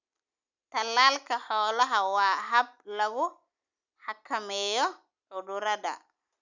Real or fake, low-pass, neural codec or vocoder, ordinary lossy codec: real; 7.2 kHz; none; none